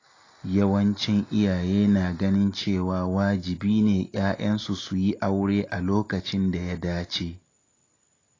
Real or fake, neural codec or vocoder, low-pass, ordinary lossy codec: real; none; 7.2 kHz; AAC, 32 kbps